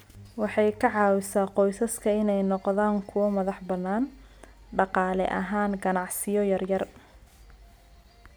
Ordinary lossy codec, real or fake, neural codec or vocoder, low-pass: none; real; none; none